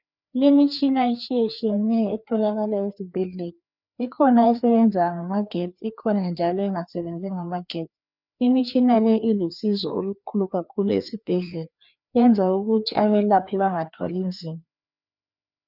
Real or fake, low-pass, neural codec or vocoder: fake; 5.4 kHz; codec, 16 kHz, 2 kbps, FreqCodec, larger model